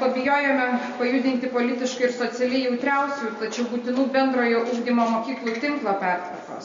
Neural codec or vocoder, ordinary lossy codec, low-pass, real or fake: none; MP3, 48 kbps; 7.2 kHz; real